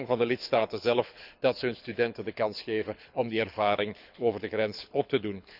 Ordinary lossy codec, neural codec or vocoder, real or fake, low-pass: none; codec, 24 kHz, 6 kbps, HILCodec; fake; 5.4 kHz